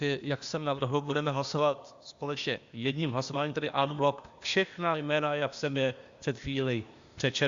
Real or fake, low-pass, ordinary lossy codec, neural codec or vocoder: fake; 7.2 kHz; Opus, 64 kbps; codec, 16 kHz, 0.8 kbps, ZipCodec